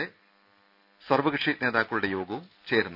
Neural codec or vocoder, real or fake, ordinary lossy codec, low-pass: none; real; none; 5.4 kHz